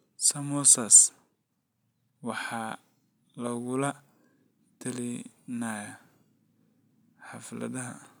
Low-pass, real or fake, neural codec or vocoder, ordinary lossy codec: none; real; none; none